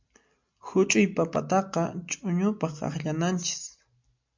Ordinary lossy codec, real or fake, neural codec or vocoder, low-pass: MP3, 64 kbps; real; none; 7.2 kHz